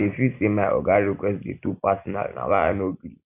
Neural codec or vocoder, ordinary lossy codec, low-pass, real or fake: none; none; 3.6 kHz; real